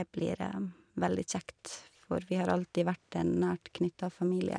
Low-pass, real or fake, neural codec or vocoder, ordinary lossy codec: 9.9 kHz; real; none; none